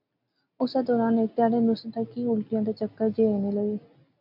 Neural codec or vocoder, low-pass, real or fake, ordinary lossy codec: none; 5.4 kHz; real; MP3, 48 kbps